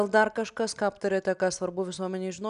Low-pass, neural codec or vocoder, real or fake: 10.8 kHz; none; real